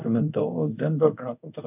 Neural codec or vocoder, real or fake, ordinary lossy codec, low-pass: codec, 24 kHz, 0.5 kbps, DualCodec; fake; none; 3.6 kHz